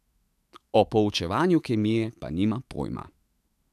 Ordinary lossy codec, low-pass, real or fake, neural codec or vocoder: AAC, 96 kbps; 14.4 kHz; fake; autoencoder, 48 kHz, 128 numbers a frame, DAC-VAE, trained on Japanese speech